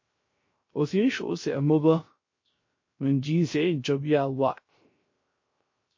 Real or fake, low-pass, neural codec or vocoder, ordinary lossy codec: fake; 7.2 kHz; codec, 16 kHz, 0.3 kbps, FocalCodec; MP3, 32 kbps